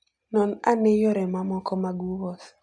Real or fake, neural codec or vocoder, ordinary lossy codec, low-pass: real; none; none; none